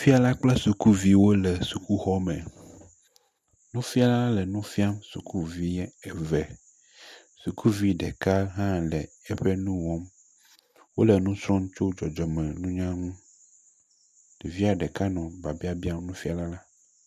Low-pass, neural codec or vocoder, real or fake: 14.4 kHz; none; real